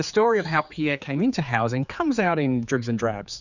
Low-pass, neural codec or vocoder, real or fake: 7.2 kHz; codec, 16 kHz, 4 kbps, X-Codec, HuBERT features, trained on general audio; fake